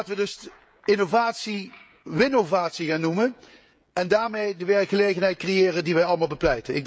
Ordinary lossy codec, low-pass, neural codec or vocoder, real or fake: none; none; codec, 16 kHz, 16 kbps, FreqCodec, smaller model; fake